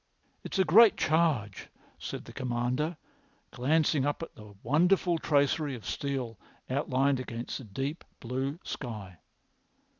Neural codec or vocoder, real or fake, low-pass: none; real; 7.2 kHz